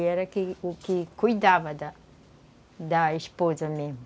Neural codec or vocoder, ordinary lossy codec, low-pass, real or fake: none; none; none; real